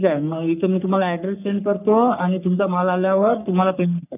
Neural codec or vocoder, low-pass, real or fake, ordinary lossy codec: codec, 44.1 kHz, 3.4 kbps, Pupu-Codec; 3.6 kHz; fake; none